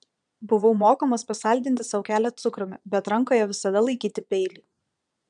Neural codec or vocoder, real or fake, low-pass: vocoder, 22.05 kHz, 80 mel bands, Vocos; fake; 9.9 kHz